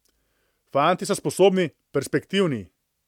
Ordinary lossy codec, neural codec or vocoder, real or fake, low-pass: MP3, 96 kbps; none; real; 19.8 kHz